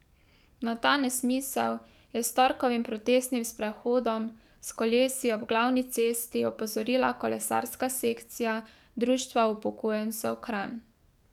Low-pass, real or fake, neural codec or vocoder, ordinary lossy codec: 19.8 kHz; fake; codec, 44.1 kHz, 7.8 kbps, DAC; none